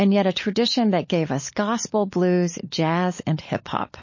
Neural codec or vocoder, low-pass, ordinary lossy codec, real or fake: codec, 16 kHz, 16 kbps, FunCodec, trained on LibriTTS, 50 frames a second; 7.2 kHz; MP3, 32 kbps; fake